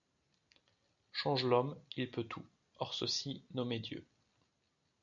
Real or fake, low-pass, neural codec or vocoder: real; 7.2 kHz; none